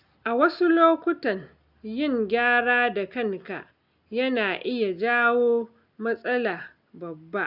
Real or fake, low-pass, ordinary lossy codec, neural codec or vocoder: real; 5.4 kHz; none; none